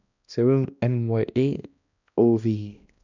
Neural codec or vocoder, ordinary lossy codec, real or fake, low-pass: codec, 16 kHz, 1 kbps, X-Codec, HuBERT features, trained on balanced general audio; none; fake; 7.2 kHz